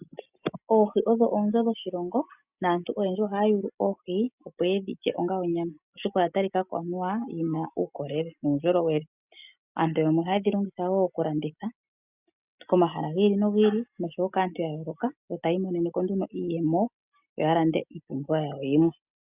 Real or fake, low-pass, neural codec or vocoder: real; 3.6 kHz; none